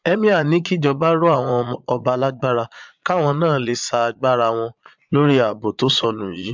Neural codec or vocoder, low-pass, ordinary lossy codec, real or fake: vocoder, 22.05 kHz, 80 mel bands, Vocos; 7.2 kHz; MP3, 64 kbps; fake